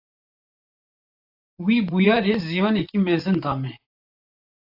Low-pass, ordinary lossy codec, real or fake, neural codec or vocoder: 5.4 kHz; AAC, 48 kbps; fake; vocoder, 44.1 kHz, 128 mel bands, Pupu-Vocoder